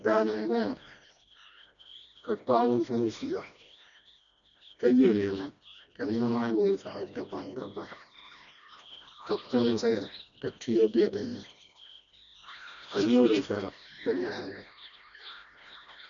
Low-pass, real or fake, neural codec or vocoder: 7.2 kHz; fake; codec, 16 kHz, 1 kbps, FreqCodec, smaller model